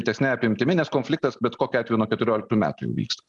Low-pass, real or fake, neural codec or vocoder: 10.8 kHz; real; none